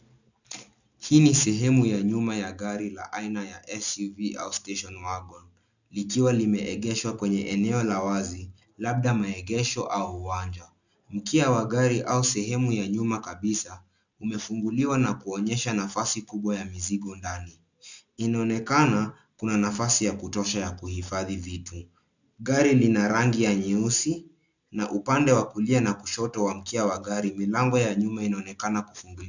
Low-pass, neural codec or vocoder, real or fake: 7.2 kHz; none; real